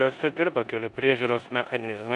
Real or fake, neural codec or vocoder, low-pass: fake; codec, 16 kHz in and 24 kHz out, 0.9 kbps, LongCat-Audio-Codec, four codebook decoder; 10.8 kHz